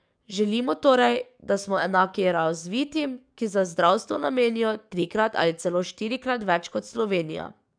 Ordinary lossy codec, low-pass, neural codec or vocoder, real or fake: none; 9.9 kHz; codec, 44.1 kHz, 7.8 kbps, DAC; fake